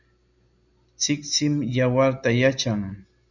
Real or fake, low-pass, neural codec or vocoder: real; 7.2 kHz; none